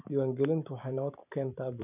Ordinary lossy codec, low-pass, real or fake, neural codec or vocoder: none; 3.6 kHz; fake; vocoder, 44.1 kHz, 128 mel bands every 256 samples, BigVGAN v2